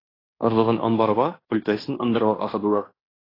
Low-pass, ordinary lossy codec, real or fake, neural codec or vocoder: 5.4 kHz; AAC, 24 kbps; fake; codec, 16 kHz in and 24 kHz out, 0.9 kbps, LongCat-Audio-Codec, fine tuned four codebook decoder